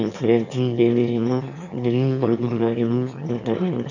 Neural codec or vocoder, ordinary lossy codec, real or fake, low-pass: autoencoder, 22.05 kHz, a latent of 192 numbers a frame, VITS, trained on one speaker; none; fake; 7.2 kHz